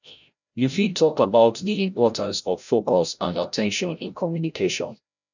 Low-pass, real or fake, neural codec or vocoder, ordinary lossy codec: 7.2 kHz; fake; codec, 16 kHz, 0.5 kbps, FreqCodec, larger model; none